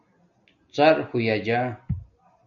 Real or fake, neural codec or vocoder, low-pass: real; none; 7.2 kHz